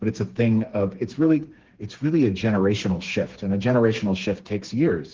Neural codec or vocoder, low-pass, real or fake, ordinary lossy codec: codec, 16 kHz, 4 kbps, FreqCodec, smaller model; 7.2 kHz; fake; Opus, 16 kbps